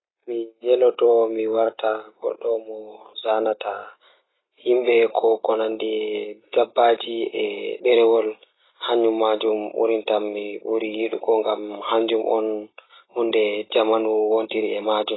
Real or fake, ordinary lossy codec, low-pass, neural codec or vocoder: real; AAC, 16 kbps; 7.2 kHz; none